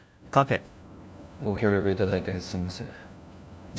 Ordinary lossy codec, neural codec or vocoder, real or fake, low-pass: none; codec, 16 kHz, 1 kbps, FunCodec, trained on LibriTTS, 50 frames a second; fake; none